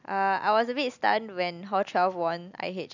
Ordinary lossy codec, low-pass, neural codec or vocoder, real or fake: none; 7.2 kHz; none; real